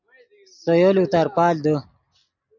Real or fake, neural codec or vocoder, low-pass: real; none; 7.2 kHz